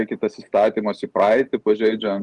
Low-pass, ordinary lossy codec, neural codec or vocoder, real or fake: 10.8 kHz; Opus, 24 kbps; none; real